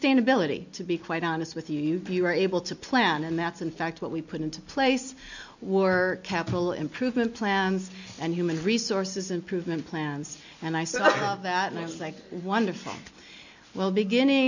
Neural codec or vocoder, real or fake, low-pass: none; real; 7.2 kHz